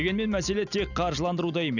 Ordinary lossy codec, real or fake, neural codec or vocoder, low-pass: none; real; none; 7.2 kHz